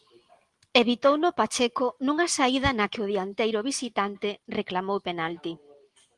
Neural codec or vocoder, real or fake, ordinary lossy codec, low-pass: none; real; Opus, 24 kbps; 10.8 kHz